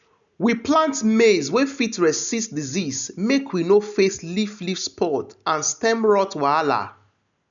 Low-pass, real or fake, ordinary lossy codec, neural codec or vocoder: 7.2 kHz; real; none; none